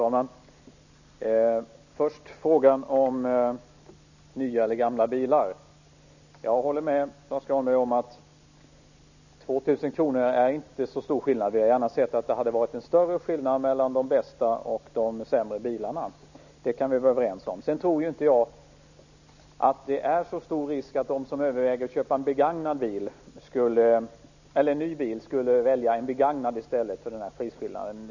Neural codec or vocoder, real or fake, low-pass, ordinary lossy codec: none; real; 7.2 kHz; none